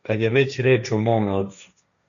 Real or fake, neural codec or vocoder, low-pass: fake; codec, 16 kHz, 1.1 kbps, Voila-Tokenizer; 7.2 kHz